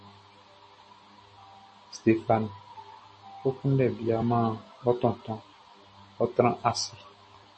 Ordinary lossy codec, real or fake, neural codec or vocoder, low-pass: MP3, 32 kbps; real; none; 10.8 kHz